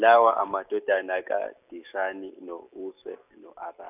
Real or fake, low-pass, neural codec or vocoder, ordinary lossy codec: real; 3.6 kHz; none; none